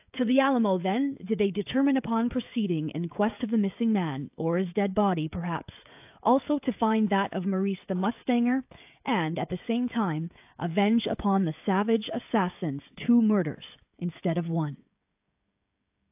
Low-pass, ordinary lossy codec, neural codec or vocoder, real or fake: 3.6 kHz; AAC, 32 kbps; codec, 16 kHz, 16 kbps, FreqCodec, smaller model; fake